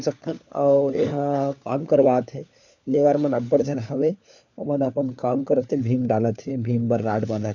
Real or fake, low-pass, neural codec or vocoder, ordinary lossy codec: fake; 7.2 kHz; codec, 16 kHz, 4 kbps, FunCodec, trained on LibriTTS, 50 frames a second; none